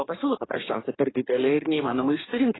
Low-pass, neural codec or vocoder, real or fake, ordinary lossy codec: 7.2 kHz; codec, 44.1 kHz, 2.6 kbps, DAC; fake; AAC, 16 kbps